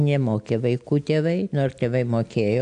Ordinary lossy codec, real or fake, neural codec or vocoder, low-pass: AAC, 96 kbps; real; none; 9.9 kHz